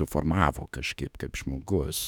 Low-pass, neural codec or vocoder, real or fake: 19.8 kHz; autoencoder, 48 kHz, 32 numbers a frame, DAC-VAE, trained on Japanese speech; fake